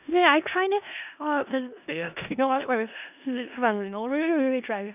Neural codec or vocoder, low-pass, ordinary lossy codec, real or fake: codec, 16 kHz in and 24 kHz out, 0.4 kbps, LongCat-Audio-Codec, four codebook decoder; 3.6 kHz; none; fake